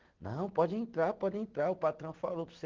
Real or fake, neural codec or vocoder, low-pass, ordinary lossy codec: real; none; 7.2 kHz; Opus, 16 kbps